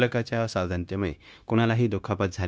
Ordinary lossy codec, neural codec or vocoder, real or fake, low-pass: none; codec, 16 kHz, 0.9 kbps, LongCat-Audio-Codec; fake; none